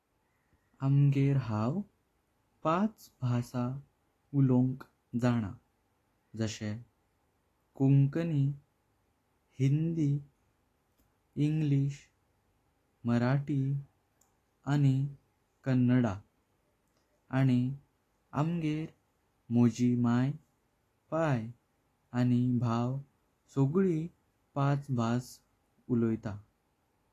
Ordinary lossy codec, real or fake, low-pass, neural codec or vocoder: AAC, 48 kbps; real; 14.4 kHz; none